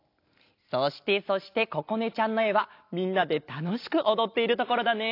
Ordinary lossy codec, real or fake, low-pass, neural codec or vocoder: AAC, 32 kbps; real; 5.4 kHz; none